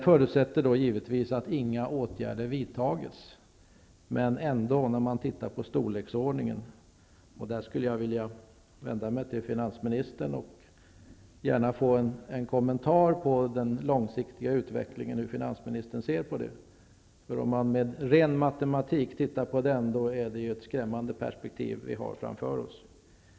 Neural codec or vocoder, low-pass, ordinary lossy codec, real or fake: none; none; none; real